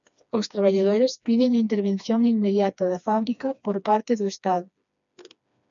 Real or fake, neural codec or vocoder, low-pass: fake; codec, 16 kHz, 2 kbps, FreqCodec, smaller model; 7.2 kHz